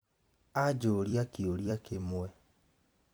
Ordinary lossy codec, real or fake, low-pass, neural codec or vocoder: none; fake; none; vocoder, 44.1 kHz, 128 mel bands every 256 samples, BigVGAN v2